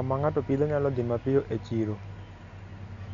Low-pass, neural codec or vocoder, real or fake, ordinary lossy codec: 7.2 kHz; none; real; none